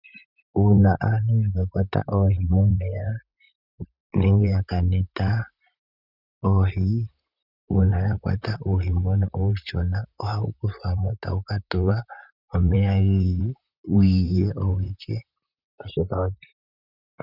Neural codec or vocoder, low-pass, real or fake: vocoder, 44.1 kHz, 128 mel bands, Pupu-Vocoder; 5.4 kHz; fake